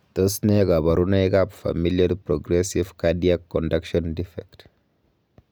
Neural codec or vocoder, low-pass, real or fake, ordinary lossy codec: none; none; real; none